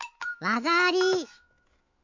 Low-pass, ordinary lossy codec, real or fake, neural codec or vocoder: 7.2 kHz; MP3, 48 kbps; fake; autoencoder, 48 kHz, 128 numbers a frame, DAC-VAE, trained on Japanese speech